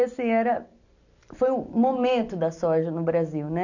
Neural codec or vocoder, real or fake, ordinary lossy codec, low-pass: none; real; none; 7.2 kHz